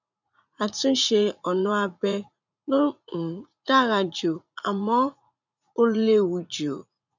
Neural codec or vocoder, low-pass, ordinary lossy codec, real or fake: vocoder, 44.1 kHz, 80 mel bands, Vocos; 7.2 kHz; none; fake